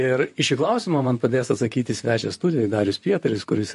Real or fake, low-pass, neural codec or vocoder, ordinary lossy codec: fake; 14.4 kHz; vocoder, 44.1 kHz, 128 mel bands, Pupu-Vocoder; MP3, 48 kbps